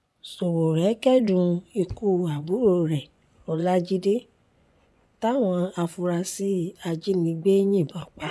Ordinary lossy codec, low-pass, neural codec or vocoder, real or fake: none; none; vocoder, 24 kHz, 100 mel bands, Vocos; fake